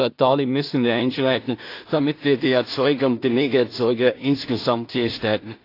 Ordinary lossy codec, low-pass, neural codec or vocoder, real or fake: AAC, 32 kbps; 5.4 kHz; codec, 16 kHz in and 24 kHz out, 0.4 kbps, LongCat-Audio-Codec, two codebook decoder; fake